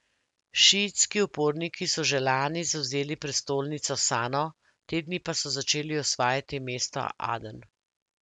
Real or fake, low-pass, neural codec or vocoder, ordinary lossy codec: real; 10.8 kHz; none; MP3, 96 kbps